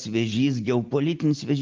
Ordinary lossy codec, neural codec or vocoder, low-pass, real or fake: Opus, 24 kbps; none; 7.2 kHz; real